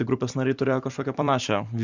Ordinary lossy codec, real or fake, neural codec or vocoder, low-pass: Opus, 64 kbps; fake; vocoder, 44.1 kHz, 128 mel bands every 256 samples, BigVGAN v2; 7.2 kHz